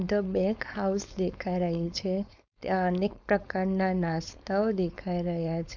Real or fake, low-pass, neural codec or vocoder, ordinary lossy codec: fake; 7.2 kHz; codec, 16 kHz, 4.8 kbps, FACodec; none